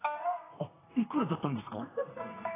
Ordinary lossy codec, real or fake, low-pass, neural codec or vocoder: AAC, 16 kbps; fake; 3.6 kHz; codec, 32 kHz, 1.9 kbps, SNAC